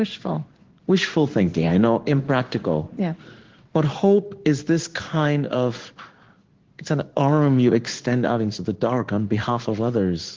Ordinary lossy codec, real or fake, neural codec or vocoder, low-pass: Opus, 16 kbps; fake; codec, 16 kHz in and 24 kHz out, 1 kbps, XY-Tokenizer; 7.2 kHz